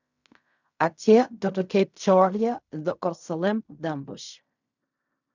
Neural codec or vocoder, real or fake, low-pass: codec, 16 kHz in and 24 kHz out, 0.4 kbps, LongCat-Audio-Codec, fine tuned four codebook decoder; fake; 7.2 kHz